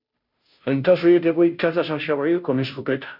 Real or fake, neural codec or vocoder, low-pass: fake; codec, 16 kHz, 0.5 kbps, FunCodec, trained on Chinese and English, 25 frames a second; 5.4 kHz